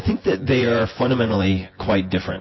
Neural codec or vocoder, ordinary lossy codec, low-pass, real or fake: vocoder, 24 kHz, 100 mel bands, Vocos; MP3, 24 kbps; 7.2 kHz; fake